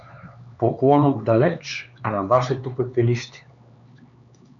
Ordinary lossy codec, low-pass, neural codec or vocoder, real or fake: MP3, 96 kbps; 7.2 kHz; codec, 16 kHz, 4 kbps, X-Codec, HuBERT features, trained on LibriSpeech; fake